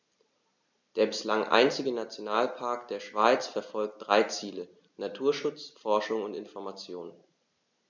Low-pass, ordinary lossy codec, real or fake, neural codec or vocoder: 7.2 kHz; none; real; none